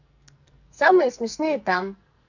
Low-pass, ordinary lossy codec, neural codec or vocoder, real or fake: 7.2 kHz; none; codec, 44.1 kHz, 2.6 kbps, SNAC; fake